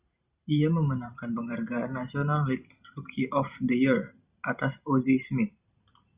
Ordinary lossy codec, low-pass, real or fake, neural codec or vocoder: Opus, 64 kbps; 3.6 kHz; fake; vocoder, 44.1 kHz, 128 mel bands every 512 samples, BigVGAN v2